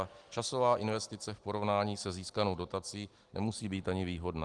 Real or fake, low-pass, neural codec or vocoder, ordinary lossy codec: real; 10.8 kHz; none; Opus, 24 kbps